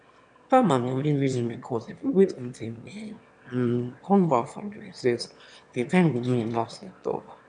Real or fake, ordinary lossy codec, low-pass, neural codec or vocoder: fake; none; 9.9 kHz; autoencoder, 22.05 kHz, a latent of 192 numbers a frame, VITS, trained on one speaker